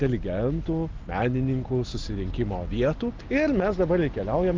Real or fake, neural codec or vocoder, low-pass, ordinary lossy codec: real; none; 7.2 kHz; Opus, 32 kbps